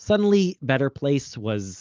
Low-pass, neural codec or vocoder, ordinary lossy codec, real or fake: 7.2 kHz; none; Opus, 32 kbps; real